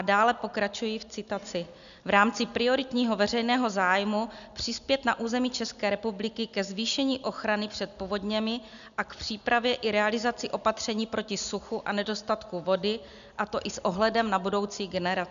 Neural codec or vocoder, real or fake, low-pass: none; real; 7.2 kHz